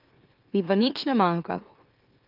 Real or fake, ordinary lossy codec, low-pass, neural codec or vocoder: fake; Opus, 32 kbps; 5.4 kHz; autoencoder, 44.1 kHz, a latent of 192 numbers a frame, MeloTTS